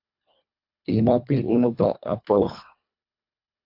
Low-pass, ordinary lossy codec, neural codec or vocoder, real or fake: 5.4 kHz; AAC, 48 kbps; codec, 24 kHz, 1.5 kbps, HILCodec; fake